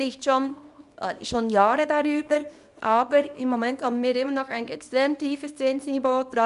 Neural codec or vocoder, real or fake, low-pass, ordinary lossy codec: codec, 24 kHz, 0.9 kbps, WavTokenizer, small release; fake; 10.8 kHz; none